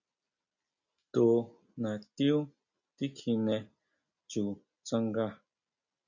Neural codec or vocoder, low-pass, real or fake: none; 7.2 kHz; real